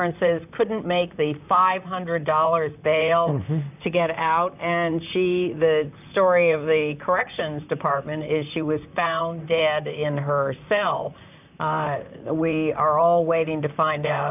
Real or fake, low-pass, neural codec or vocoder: fake; 3.6 kHz; vocoder, 44.1 kHz, 128 mel bands, Pupu-Vocoder